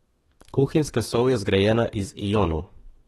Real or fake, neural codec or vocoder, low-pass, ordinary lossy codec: fake; codec, 32 kHz, 1.9 kbps, SNAC; 14.4 kHz; AAC, 32 kbps